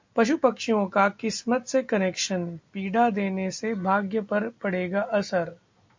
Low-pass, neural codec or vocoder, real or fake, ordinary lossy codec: 7.2 kHz; none; real; MP3, 48 kbps